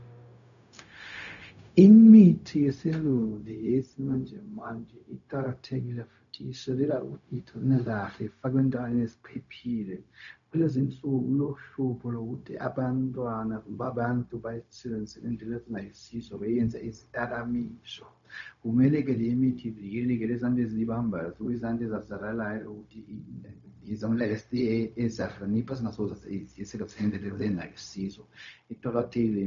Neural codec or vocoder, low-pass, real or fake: codec, 16 kHz, 0.4 kbps, LongCat-Audio-Codec; 7.2 kHz; fake